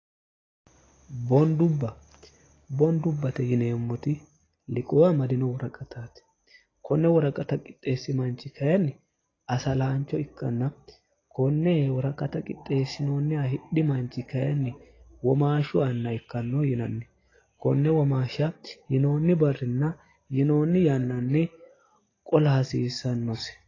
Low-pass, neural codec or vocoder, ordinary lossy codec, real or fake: 7.2 kHz; none; AAC, 32 kbps; real